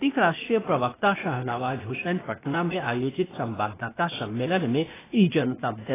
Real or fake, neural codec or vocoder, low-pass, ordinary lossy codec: fake; codec, 16 kHz, 0.8 kbps, ZipCodec; 3.6 kHz; AAC, 16 kbps